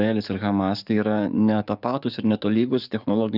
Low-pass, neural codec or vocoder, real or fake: 5.4 kHz; codec, 44.1 kHz, 7.8 kbps, DAC; fake